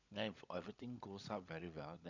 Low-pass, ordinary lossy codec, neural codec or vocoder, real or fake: 7.2 kHz; none; codec, 16 kHz, 16 kbps, FunCodec, trained on LibriTTS, 50 frames a second; fake